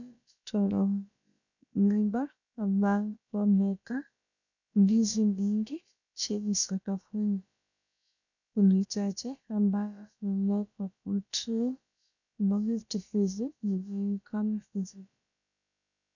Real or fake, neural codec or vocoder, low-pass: fake; codec, 16 kHz, about 1 kbps, DyCAST, with the encoder's durations; 7.2 kHz